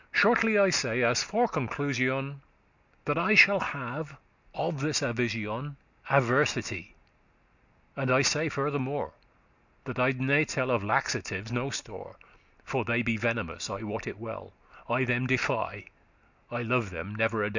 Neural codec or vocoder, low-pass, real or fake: none; 7.2 kHz; real